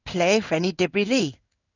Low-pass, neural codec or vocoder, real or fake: 7.2 kHz; codec, 16 kHz in and 24 kHz out, 1 kbps, XY-Tokenizer; fake